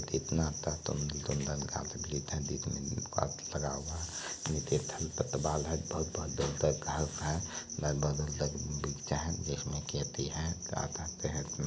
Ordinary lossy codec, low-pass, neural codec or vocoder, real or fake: none; none; none; real